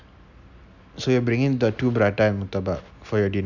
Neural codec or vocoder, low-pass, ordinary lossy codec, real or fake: none; 7.2 kHz; none; real